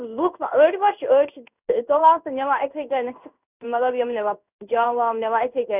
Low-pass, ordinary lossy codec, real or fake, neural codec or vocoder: 3.6 kHz; none; fake; codec, 16 kHz in and 24 kHz out, 1 kbps, XY-Tokenizer